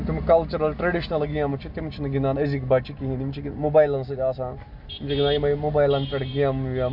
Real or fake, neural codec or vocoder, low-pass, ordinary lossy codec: real; none; 5.4 kHz; Opus, 64 kbps